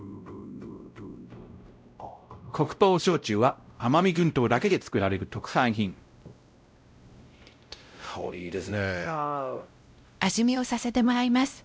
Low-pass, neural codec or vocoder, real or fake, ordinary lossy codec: none; codec, 16 kHz, 0.5 kbps, X-Codec, WavLM features, trained on Multilingual LibriSpeech; fake; none